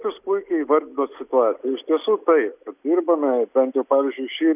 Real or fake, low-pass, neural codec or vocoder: real; 3.6 kHz; none